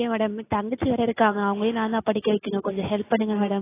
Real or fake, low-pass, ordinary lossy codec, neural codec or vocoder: real; 3.6 kHz; AAC, 16 kbps; none